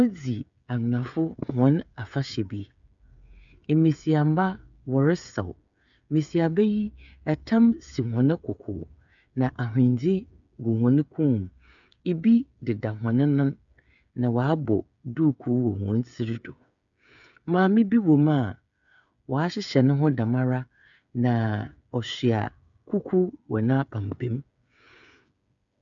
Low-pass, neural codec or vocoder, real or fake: 7.2 kHz; codec, 16 kHz, 8 kbps, FreqCodec, smaller model; fake